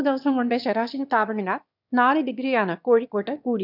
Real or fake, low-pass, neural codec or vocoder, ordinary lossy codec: fake; 5.4 kHz; autoencoder, 22.05 kHz, a latent of 192 numbers a frame, VITS, trained on one speaker; none